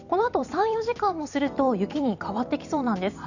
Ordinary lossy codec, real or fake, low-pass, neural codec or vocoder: none; fake; 7.2 kHz; vocoder, 44.1 kHz, 128 mel bands every 256 samples, BigVGAN v2